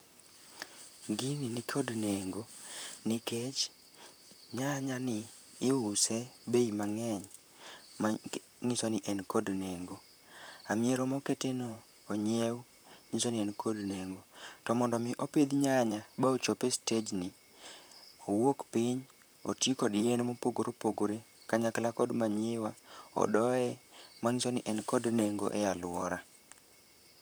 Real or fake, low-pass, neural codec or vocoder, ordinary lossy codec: fake; none; vocoder, 44.1 kHz, 128 mel bands, Pupu-Vocoder; none